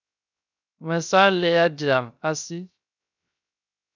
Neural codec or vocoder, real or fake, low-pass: codec, 16 kHz, 0.3 kbps, FocalCodec; fake; 7.2 kHz